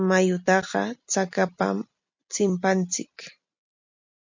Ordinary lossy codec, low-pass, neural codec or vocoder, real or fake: MP3, 64 kbps; 7.2 kHz; none; real